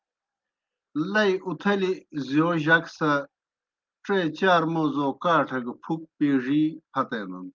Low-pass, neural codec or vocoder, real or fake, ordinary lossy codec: 7.2 kHz; none; real; Opus, 32 kbps